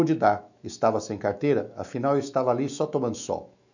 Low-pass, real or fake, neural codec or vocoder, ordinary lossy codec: 7.2 kHz; fake; autoencoder, 48 kHz, 128 numbers a frame, DAC-VAE, trained on Japanese speech; none